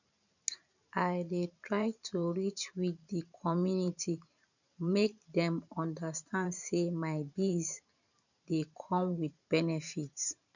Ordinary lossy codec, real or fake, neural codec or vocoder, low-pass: none; fake; vocoder, 44.1 kHz, 128 mel bands every 512 samples, BigVGAN v2; 7.2 kHz